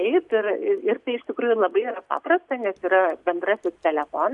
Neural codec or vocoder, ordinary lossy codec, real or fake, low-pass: none; MP3, 96 kbps; real; 10.8 kHz